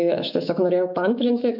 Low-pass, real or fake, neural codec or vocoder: 5.4 kHz; fake; autoencoder, 48 kHz, 128 numbers a frame, DAC-VAE, trained on Japanese speech